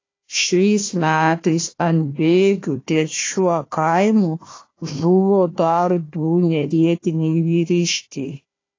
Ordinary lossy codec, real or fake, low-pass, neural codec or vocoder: AAC, 32 kbps; fake; 7.2 kHz; codec, 16 kHz, 1 kbps, FunCodec, trained on Chinese and English, 50 frames a second